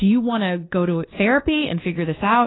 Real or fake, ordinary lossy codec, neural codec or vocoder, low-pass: fake; AAC, 16 kbps; codec, 24 kHz, 1.2 kbps, DualCodec; 7.2 kHz